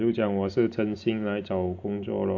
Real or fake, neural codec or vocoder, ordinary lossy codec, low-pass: real; none; MP3, 48 kbps; 7.2 kHz